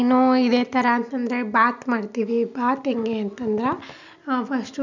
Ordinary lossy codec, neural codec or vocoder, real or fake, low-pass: none; none; real; 7.2 kHz